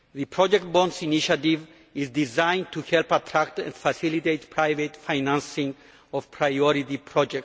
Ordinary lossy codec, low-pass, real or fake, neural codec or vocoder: none; none; real; none